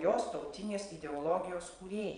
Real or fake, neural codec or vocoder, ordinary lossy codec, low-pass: fake; vocoder, 22.05 kHz, 80 mel bands, Vocos; MP3, 96 kbps; 9.9 kHz